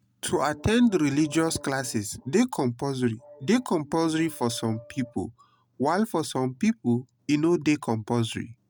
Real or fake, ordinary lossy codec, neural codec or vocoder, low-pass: real; none; none; none